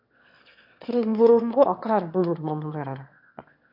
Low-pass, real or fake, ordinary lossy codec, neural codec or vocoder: 5.4 kHz; fake; MP3, 48 kbps; autoencoder, 22.05 kHz, a latent of 192 numbers a frame, VITS, trained on one speaker